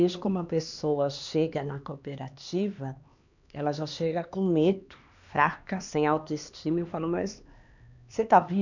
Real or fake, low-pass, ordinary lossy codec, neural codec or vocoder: fake; 7.2 kHz; none; codec, 16 kHz, 2 kbps, X-Codec, HuBERT features, trained on LibriSpeech